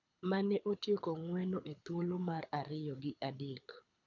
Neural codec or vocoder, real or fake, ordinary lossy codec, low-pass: codec, 24 kHz, 6 kbps, HILCodec; fake; none; 7.2 kHz